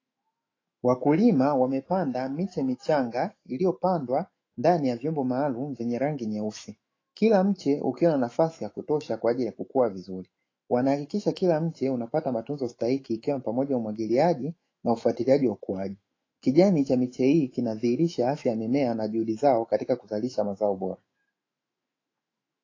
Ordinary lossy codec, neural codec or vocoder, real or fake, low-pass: AAC, 32 kbps; autoencoder, 48 kHz, 128 numbers a frame, DAC-VAE, trained on Japanese speech; fake; 7.2 kHz